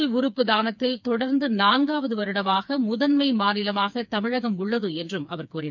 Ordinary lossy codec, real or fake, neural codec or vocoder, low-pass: none; fake; codec, 16 kHz, 4 kbps, FreqCodec, smaller model; 7.2 kHz